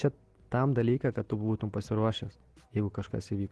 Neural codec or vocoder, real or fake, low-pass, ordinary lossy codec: none; real; 10.8 kHz; Opus, 16 kbps